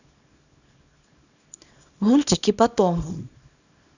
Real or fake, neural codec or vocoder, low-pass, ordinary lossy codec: fake; codec, 24 kHz, 0.9 kbps, WavTokenizer, small release; 7.2 kHz; none